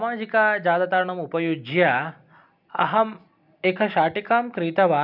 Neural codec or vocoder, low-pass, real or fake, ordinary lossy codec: none; 5.4 kHz; real; none